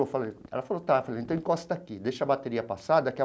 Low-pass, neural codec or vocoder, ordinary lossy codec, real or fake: none; none; none; real